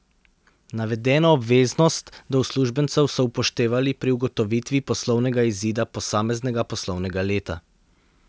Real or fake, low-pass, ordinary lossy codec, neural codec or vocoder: real; none; none; none